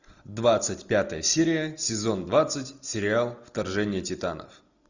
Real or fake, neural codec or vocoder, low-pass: real; none; 7.2 kHz